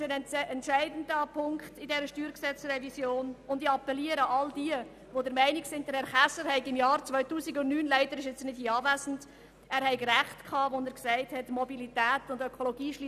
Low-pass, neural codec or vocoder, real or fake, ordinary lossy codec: 14.4 kHz; none; real; none